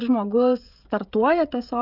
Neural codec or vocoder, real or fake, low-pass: codec, 16 kHz, 16 kbps, FreqCodec, smaller model; fake; 5.4 kHz